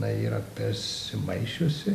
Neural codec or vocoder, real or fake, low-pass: none; real; 14.4 kHz